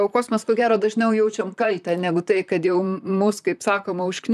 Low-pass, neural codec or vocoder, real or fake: 14.4 kHz; vocoder, 44.1 kHz, 128 mel bands, Pupu-Vocoder; fake